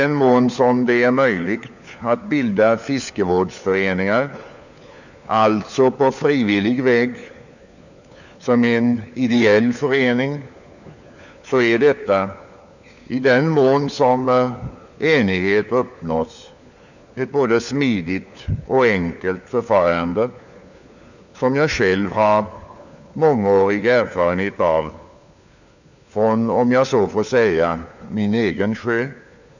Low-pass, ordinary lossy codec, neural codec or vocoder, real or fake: 7.2 kHz; none; codec, 16 kHz, 4 kbps, FunCodec, trained on LibriTTS, 50 frames a second; fake